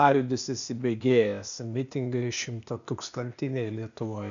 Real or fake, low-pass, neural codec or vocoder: fake; 7.2 kHz; codec, 16 kHz, 0.8 kbps, ZipCodec